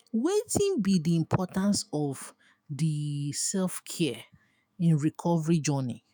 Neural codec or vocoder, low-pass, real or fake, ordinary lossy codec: autoencoder, 48 kHz, 128 numbers a frame, DAC-VAE, trained on Japanese speech; none; fake; none